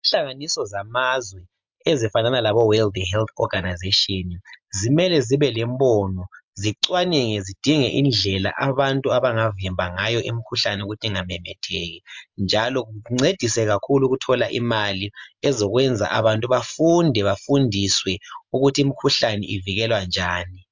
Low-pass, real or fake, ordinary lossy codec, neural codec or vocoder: 7.2 kHz; real; MP3, 64 kbps; none